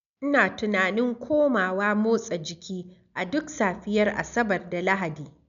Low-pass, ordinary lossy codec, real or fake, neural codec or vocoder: 7.2 kHz; none; real; none